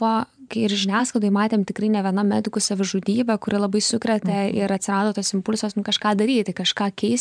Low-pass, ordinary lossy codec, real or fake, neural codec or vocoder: 9.9 kHz; MP3, 96 kbps; fake; vocoder, 44.1 kHz, 128 mel bands every 512 samples, BigVGAN v2